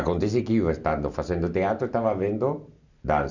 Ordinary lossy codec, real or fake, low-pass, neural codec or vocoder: none; real; 7.2 kHz; none